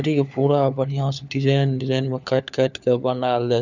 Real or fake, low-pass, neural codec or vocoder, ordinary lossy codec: fake; 7.2 kHz; codec, 16 kHz, 4 kbps, FunCodec, trained on LibriTTS, 50 frames a second; none